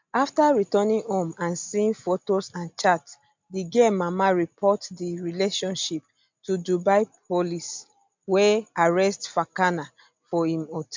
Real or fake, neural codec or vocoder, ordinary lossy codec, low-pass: real; none; MP3, 64 kbps; 7.2 kHz